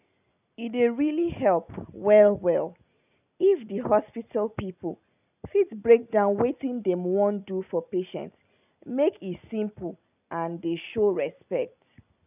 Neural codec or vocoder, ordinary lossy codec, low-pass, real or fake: none; none; 3.6 kHz; real